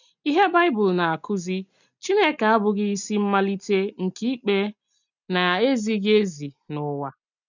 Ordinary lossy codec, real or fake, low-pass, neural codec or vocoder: none; real; 7.2 kHz; none